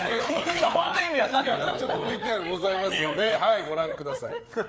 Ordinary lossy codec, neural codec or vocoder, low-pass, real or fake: none; codec, 16 kHz, 4 kbps, FreqCodec, larger model; none; fake